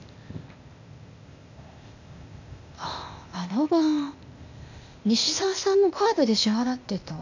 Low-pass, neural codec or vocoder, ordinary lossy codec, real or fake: 7.2 kHz; codec, 16 kHz, 0.8 kbps, ZipCodec; none; fake